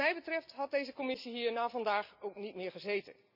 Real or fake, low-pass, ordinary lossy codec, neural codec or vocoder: real; 5.4 kHz; none; none